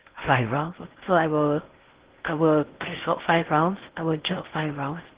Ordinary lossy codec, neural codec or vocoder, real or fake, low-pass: Opus, 16 kbps; codec, 16 kHz in and 24 kHz out, 0.6 kbps, FocalCodec, streaming, 4096 codes; fake; 3.6 kHz